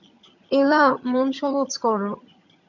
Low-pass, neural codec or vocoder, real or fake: 7.2 kHz; vocoder, 22.05 kHz, 80 mel bands, HiFi-GAN; fake